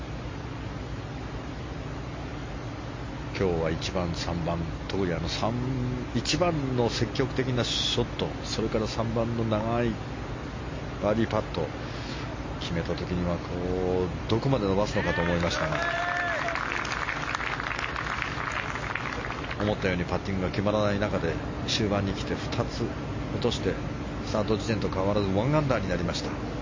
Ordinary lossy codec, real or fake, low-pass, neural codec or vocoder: MP3, 32 kbps; real; 7.2 kHz; none